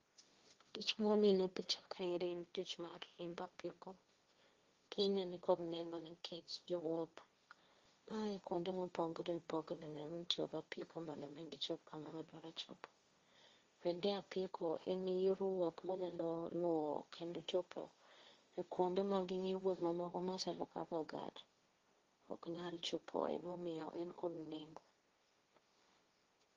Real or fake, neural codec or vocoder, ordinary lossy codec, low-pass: fake; codec, 16 kHz, 1.1 kbps, Voila-Tokenizer; Opus, 32 kbps; 7.2 kHz